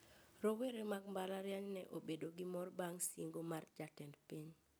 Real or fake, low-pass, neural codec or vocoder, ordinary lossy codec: real; none; none; none